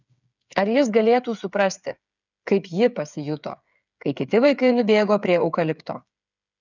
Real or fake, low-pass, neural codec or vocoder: fake; 7.2 kHz; codec, 16 kHz, 8 kbps, FreqCodec, smaller model